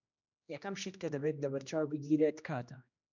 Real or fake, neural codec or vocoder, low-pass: fake; codec, 16 kHz, 1 kbps, X-Codec, HuBERT features, trained on general audio; 7.2 kHz